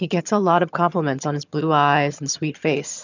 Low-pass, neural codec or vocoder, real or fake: 7.2 kHz; vocoder, 22.05 kHz, 80 mel bands, HiFi-GAN; fake